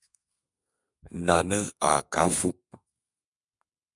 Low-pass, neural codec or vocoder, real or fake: 10.8 kHz; codec, 32 kHz, 1.9 kbps, SNAC; fake